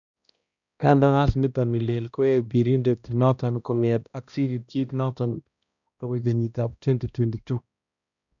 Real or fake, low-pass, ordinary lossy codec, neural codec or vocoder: fake; 7.2 kHz; none; codec, 16 kHz, 1 kbps, X-Codec, HuBERT features, trained on balanced general audio